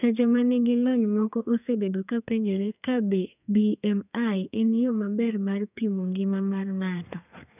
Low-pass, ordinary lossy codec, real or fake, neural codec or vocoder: 3.6 kHz; none; fake; codec, 32 kHz, 1.9 kbps, SNAC